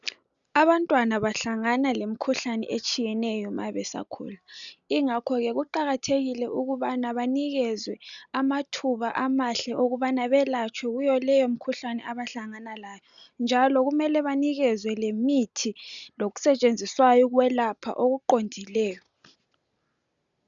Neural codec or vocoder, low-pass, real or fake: none; 7.2 kHz; real